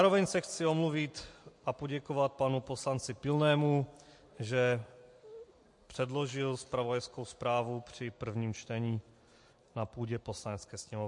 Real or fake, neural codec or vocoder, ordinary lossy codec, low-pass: real; none; MP3, 48 kbps; 10.8 kHz